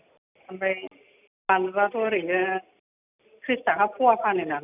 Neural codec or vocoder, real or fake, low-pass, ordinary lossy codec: none; real; 3.6 kHz; none